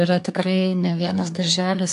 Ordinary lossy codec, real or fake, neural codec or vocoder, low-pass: AAC, 64 kbps; fake; codec, 24 kHz, 1 kbps, SNAC; 10.8 kHz